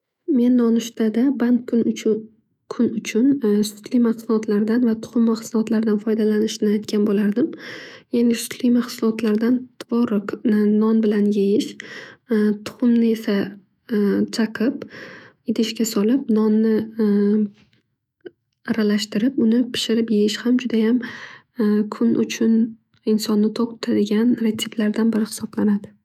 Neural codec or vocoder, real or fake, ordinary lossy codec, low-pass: autoencoder, 48 kHz, 128 numbers a frame, DAC-VAE, trained on Japanese speech; fake; none; 19.8 kHz